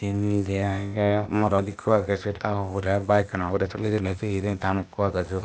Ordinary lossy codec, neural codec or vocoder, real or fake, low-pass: none; codec, 16 kHz, 0.8 kbps, ZipCodec; fake; none